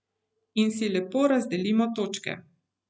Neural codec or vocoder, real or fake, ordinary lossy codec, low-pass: none; real; none; none